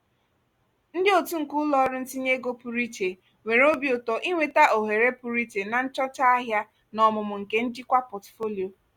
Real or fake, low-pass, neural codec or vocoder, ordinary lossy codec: real; 19.8 kHz; none; Opus, 64 kbps